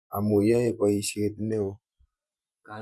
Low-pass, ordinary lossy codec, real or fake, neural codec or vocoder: none; none; fake; vocoder, 24 kHz, 100 mel bands, Vocos